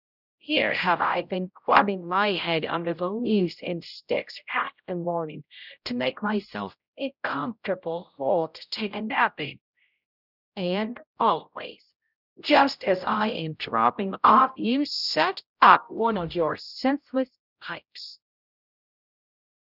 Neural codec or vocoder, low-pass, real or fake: codec, 16 kHz, 0.5 kbps, X-Codec, HuBERT features, trained on general audio; 5.4 kHz; fake